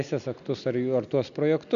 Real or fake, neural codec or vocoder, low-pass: real; none; 7.2 kHz